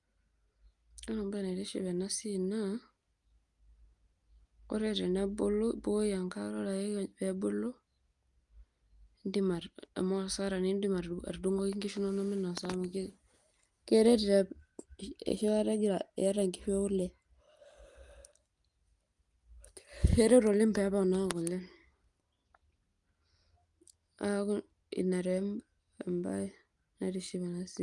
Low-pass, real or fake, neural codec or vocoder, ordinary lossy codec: 10.8 kHz; real; none; Opus, 32 kbps